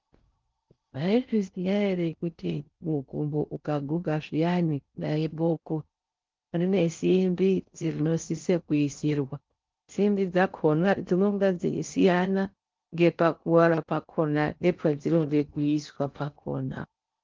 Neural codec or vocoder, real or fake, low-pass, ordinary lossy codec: codec, 16 kHz in and 24 kHz out, 0.6 kbps, FocalCodec, streaming, 4096 codes; fake; 7.2 kHz; Opus, 32 kbps